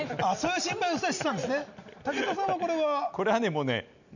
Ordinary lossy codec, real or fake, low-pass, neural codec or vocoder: none; real; 7.2 kHz; none